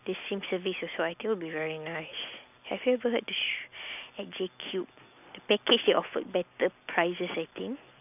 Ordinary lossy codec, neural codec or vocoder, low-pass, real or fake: none; none; 3.6 kHz; real